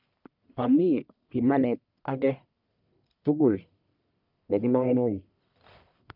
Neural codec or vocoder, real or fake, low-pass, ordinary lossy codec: codec, 44.1 kHz, 1.7 kbps, Pupu-Codec; fake; 5.4 kHz; none